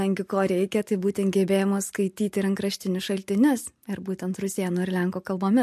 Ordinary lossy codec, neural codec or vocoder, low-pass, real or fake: MP3, 64 kbps; none; 14.4 kHz; real